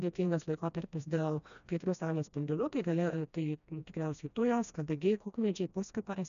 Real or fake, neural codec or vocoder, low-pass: fake; codec, 16 kHz, 1 kbps, FreqCodec, smaller model; 7.2 kHz